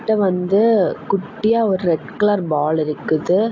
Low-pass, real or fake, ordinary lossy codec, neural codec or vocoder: 7.2 kHz; real; AAC, 48 kbps; none